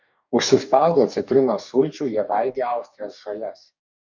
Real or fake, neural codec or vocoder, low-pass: fake; codec, 44.1 kHz, 2.6 kbps, DAC; 7.2 kHz